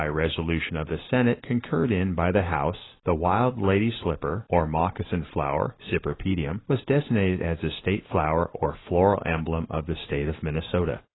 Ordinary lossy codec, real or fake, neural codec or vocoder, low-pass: AAC, 16 kbps; real; none; 7.2 kHz